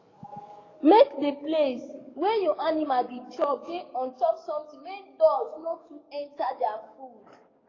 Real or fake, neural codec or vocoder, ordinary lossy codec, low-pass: fake; codec, 44.1 kHz, 7.8 kbps, DAC; AAC, 32 kbps; 7.2 kHz